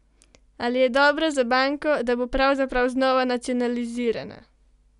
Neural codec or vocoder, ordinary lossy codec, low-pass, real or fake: none; none; 10.8 kHz; real